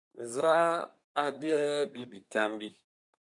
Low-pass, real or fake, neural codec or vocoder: 10.8 kHz; fake; codec, 24 kHz, 1 kbps, SNAC